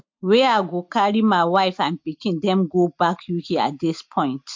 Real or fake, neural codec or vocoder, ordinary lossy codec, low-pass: real; none; MP3, 48 kbps; 7.2 kHz